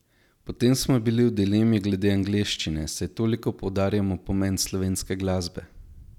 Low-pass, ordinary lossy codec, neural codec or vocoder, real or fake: 19.8 kHz; none; none; real